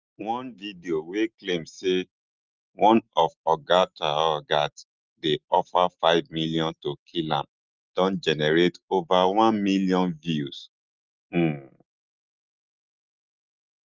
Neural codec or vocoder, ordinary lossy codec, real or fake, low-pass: none; Opus, 32 kbps; real; 7.2 kHz